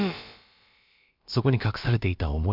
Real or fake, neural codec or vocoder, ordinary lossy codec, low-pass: fake; codec, 16 kHz, about 1 kbps, DyCAST, with the encoder's durations; MP3, 48 kbps; 5.4 kHz